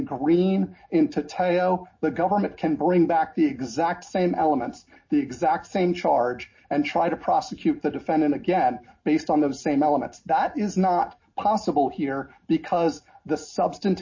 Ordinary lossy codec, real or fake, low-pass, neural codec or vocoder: MP3, 32 kbps; real; 7.2 kHz; none